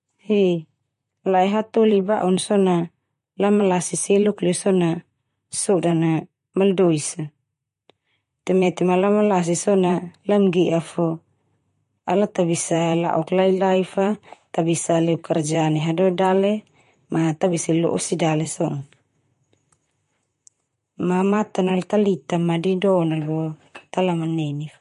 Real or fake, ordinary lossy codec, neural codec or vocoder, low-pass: fake; MP3, 48 kbps; vocoder, 44.1 kHz, 128 mel bands, Pupu-Vocoder; 14.4 kHz